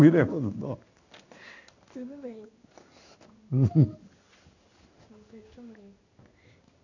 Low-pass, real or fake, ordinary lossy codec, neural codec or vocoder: 7.2 kHz; fake; none; codec, 16 kHz in and 24 kHz out, 1 kbps, XY-Tokenizer